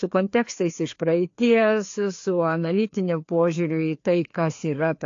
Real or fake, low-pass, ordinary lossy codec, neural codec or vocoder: fake; 7.2 kHz; AAC, 48 kbps; codec, 16 kHz, 2 kbps, FreqCodec, larger model